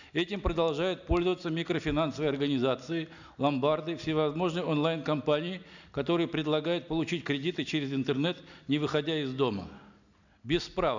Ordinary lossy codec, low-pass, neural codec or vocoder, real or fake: none; 7.2 kHz; none; real